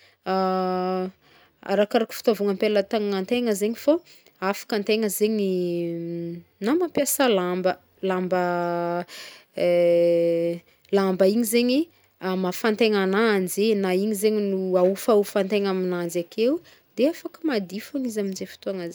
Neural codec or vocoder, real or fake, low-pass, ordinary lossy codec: none; real; none; none